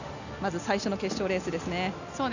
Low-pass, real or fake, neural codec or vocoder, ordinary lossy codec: 7.2 kHz; real; none; none